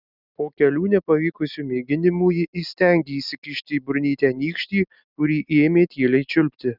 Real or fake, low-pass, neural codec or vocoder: real; 5.4 kHz; none